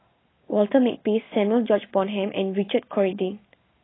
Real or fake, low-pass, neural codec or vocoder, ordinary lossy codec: real; 7.2 kHz; none; AAC, 16 kbps